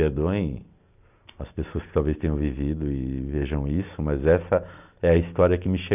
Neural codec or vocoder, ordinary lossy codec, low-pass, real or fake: none; none; 3.6 kHz; real